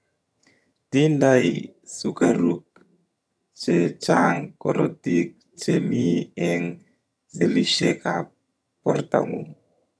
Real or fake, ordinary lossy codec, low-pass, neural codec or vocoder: fake; none; none; vocoder, 22.05 kHz, 80 mel bands, HiFi-GAN